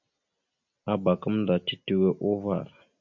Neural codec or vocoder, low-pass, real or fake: none; 7.2 kHz; real